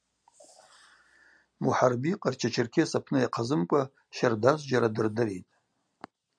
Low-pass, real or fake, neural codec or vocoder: 9.9 kHz; real; none